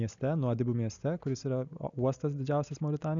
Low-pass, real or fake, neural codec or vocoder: 7.2 kHz; real; none